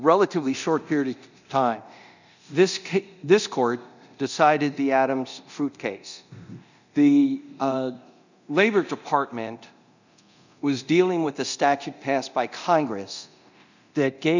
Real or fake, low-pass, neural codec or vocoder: fake; 7.2 kHz; codec, 24 kHz, 0.9 kbps, DualCodec